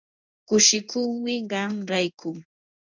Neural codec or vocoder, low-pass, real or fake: codec, 16 kHz in and 24 kHz out, 1 kbps, XY-Tokenizer; 7.2 kHz; fake